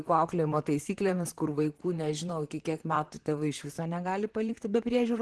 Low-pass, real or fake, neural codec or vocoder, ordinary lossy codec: 10.8 kHz; fake; vocoder, 44.1 kHz, 128 mel bands, Pupu-Vocoder; Opus, 16 kbps